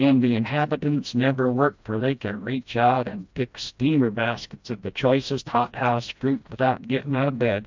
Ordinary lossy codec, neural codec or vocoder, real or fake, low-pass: MP3, 64 kbps; codec, 16 kHz, 1 kbps, FreqCodec, smaller model; fake; 7.2 kHz